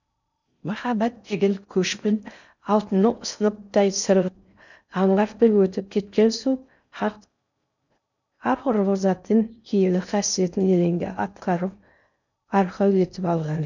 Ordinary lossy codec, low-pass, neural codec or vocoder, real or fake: none; 7.2 kHz; codec, 16 kHz in and 24 kHz out, 0.6 kbps, FocalCodec, streaming, 4096 codes; fake